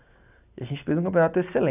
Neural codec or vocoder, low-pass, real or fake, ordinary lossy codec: none; 3.6 kHz; real; none